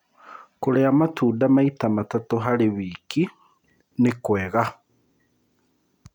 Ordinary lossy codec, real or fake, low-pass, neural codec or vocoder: none; real; 19.8 kHz; none